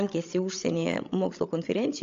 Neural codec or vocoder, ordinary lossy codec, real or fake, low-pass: codec, 16 kHz, 16 kbps, FreqCodec, larger model; AAC, 48 kbps; fake; 7.2 kHz